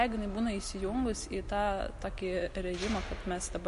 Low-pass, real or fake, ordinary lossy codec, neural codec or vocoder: 14.4 kHz; real; MP3, 48 kbps; none